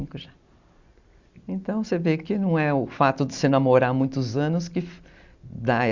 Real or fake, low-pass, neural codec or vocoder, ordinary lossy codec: real; 7.2 kHz; none; Opus, 64 kbps